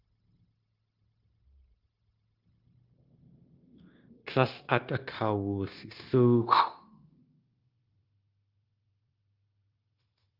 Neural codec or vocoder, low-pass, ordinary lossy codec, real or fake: codec, 16 kHz, 0.9 kbps, LongCat-Audio-Codec; 5.4 kHz; Opus, 32 kbps; fake